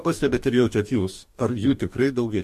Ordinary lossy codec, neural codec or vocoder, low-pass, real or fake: MP3, 64 kbps; codec, 44.1 kHz, 2.6 kbps, DAC; 14.4 kHz; fake